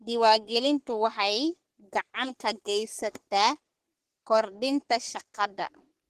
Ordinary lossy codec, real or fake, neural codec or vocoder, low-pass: Opus, 16 kbps; fake; codec, 44.1 kHz, 3.4 kbps, Pupu-Codec; 14.4 kHz